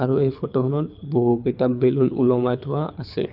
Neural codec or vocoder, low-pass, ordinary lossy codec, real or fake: codec, 24 kHz, 6 kbps, HILCodec; 5.4 kHz; none; fake